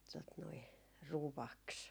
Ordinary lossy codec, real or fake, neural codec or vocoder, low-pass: none; real; none; none